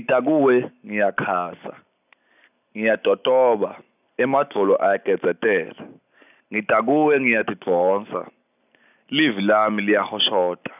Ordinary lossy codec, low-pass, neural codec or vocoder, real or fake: none; 3.6 kHz; none; real